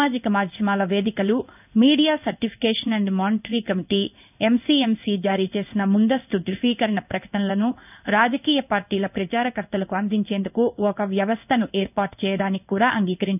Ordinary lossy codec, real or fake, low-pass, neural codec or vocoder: none; fake; 3.6 kHz; codec, 16 kHz in and 24 kHz out, 1 kbps, XY-Tokenizer